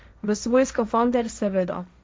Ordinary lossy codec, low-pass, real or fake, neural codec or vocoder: none; none; fake; codec, 16 kHz, 1.1 kbps, Voila-Tokenizer